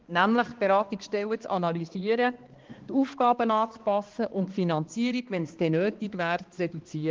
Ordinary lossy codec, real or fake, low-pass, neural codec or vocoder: Opus, 16 kbps; fake; 7.2 kHz; codec, 16 kHz, 2 kbps, X-Codec, HuBERT features, trained on balanced general audio